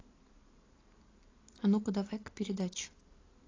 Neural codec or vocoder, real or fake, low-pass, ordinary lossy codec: vocoder, 44.1 kHz, 128 mel bands every 512 samples, BigVGAN v2; fake; 7.2 kHz; AAC, 32 kbps